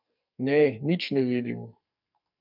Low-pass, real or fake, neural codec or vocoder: 5.4 kHz; fake; codec, 44.1 kHz, 2.6 kbps, SNAC